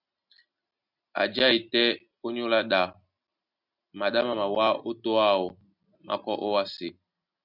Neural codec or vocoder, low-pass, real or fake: none; 5.4 kHz; real